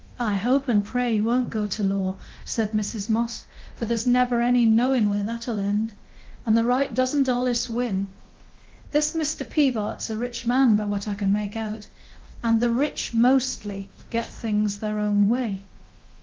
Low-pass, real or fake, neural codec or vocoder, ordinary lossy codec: 7.2 kHz; fake; codec, 24 kHz, 0.9 kbps, DualCodec; Opus, 16 kbps